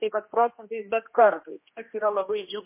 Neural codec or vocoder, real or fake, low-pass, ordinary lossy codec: codec, 16 kHz, 1 kbps, X-Codec, HuBERT features, trained on general audio; fake; 3.6 kHz; MP3, 24 kbps